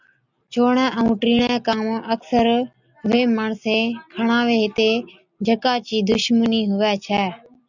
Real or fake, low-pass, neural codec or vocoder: real; 7.2 kHz; none